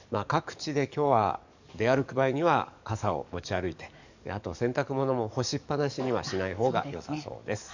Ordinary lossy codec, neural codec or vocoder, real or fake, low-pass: none; codec, 44.1 kHz, 7.8 kbps, DAC; fake; 7.2 kHz